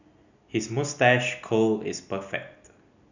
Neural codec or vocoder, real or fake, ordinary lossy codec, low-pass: none; real; none; 7.2 kHz